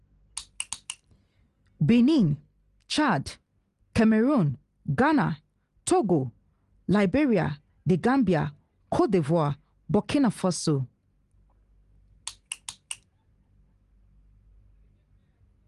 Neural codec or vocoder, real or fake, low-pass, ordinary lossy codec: none; real; 10.8 kHz; Opus, 24 kbps